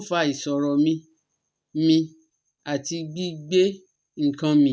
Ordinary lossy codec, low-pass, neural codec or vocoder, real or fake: none; none; none; real